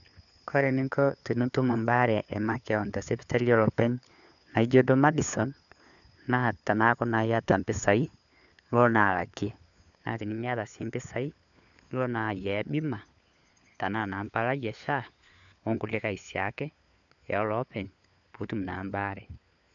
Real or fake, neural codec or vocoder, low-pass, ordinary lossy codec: fake; codec, 16 kHz, 4 kbps, FunCodec, trained on LibriTTS, 50 frames a second; 7.2 kHz; none